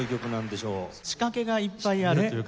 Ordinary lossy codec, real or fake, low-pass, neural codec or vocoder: none; real; none; none